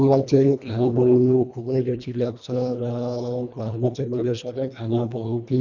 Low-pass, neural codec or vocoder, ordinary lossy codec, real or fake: 7.2 kHz; codec, 24 kHz, 1.5 kbps, HILCodec; none; fake